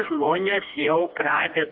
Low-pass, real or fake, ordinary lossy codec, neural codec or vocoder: 5.4 kHz; fake; MP3, 48 kbps; codec, 16 kHz, 1 kbps, FreqCodec, smaller model